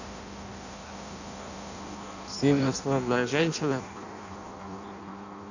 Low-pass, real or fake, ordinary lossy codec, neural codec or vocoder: 7.2 kHz; fake; none; codec, 16 kHz in and 24 kHz out, 0.6 kbps, FireRedTTS-2 codec